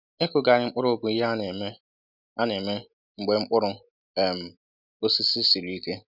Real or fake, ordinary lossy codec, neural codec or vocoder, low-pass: real; none; none; 5.4 kHz